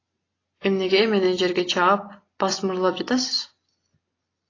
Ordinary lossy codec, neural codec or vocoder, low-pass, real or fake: AAC, 32 kbps; none; 7.2 kHz; real